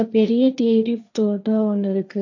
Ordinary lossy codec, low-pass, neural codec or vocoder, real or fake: none; 7.2 kHz; codec, 16 kHz, 1.1 kbps, Voila-Tokenizer; fake